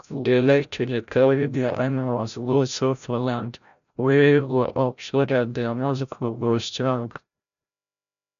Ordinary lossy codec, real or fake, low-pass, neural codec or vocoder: none; fake; 7.2 kHz; codec, 16 kHz, 0.5 kbps, FreqCodec, larger model